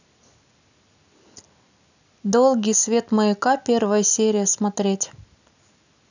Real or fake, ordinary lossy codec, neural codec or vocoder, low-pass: real; none; none; 7.2 kHz